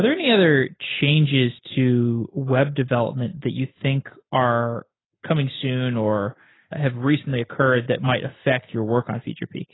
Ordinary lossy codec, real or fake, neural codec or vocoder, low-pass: AAC, 16 kbps; real; none; 7.2 kHz